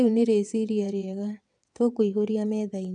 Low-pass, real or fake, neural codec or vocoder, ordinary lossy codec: 9.9 kHz; fake; vocoder, 22.05 kHz, 80 mel bands, WaveNeXt; none